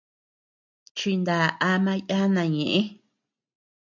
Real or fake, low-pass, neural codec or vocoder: real; 7.2 kHz; none